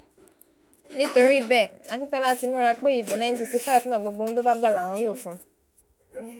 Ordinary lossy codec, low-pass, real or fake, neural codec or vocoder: none; none; fake; autoencoder, 48 kHz, 32 numbers a frame, DAC-VAE, trained on Japanese speech